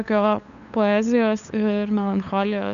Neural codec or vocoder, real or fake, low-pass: codec, 16 kHz, 8 kbps, FunCodec, trained on LibriTTS, 25 frames a second; fake; 7.2 kHz